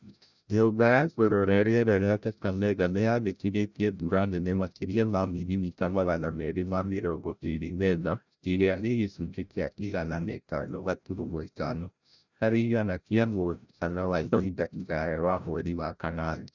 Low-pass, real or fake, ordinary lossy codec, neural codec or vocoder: 7.2 kHz; fake; none; codec, 16 kHz, 0.5 kbps, FreqCodec, larger model